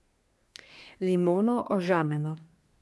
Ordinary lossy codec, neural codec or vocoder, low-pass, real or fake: none; codec, 24 kHz, 1 kbps, SNAC; none; fake